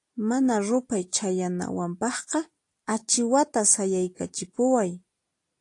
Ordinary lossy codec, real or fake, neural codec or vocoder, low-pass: AAC, 48 kbps; real; none; 10.8 kHz